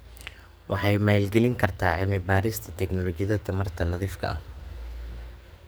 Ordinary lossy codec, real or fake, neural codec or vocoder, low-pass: none; fake; codec, 44.1 kHz, 2.6 kbps, SNAC; none